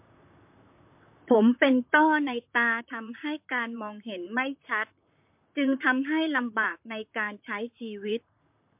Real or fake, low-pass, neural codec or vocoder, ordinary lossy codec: fake; 3.6 kHz; vocoder, 44.1 kHz, 128 mel bands, Pupu-Vocoder; MP3, 32 kbps